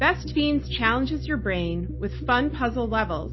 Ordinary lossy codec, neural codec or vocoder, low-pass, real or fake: MP3, 24 kbps; none; 7.2 kHz; real